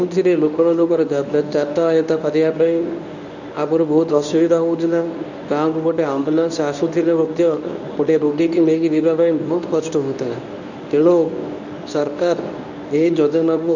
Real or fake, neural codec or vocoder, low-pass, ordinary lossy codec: fake; codec, 24 kHz, 0.9 kbps, WavTokenizer, medium speech release version 1; 7.2 kHz; none